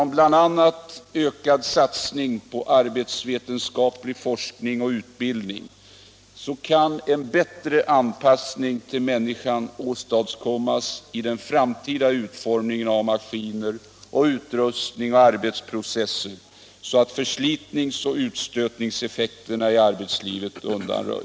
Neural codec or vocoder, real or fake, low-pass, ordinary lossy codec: none; real; none; none